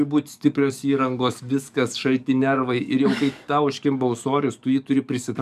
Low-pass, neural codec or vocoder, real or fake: 14.4 kHz; codec, 44.1 kHz, 7.8 kbps, DAC; fake